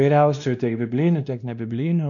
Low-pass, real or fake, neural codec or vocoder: 7.2 kHz; fake; codec, 16 kHz, 1 kbps, X-Codec, WavLM features, trained on Multilingual LibriSpeech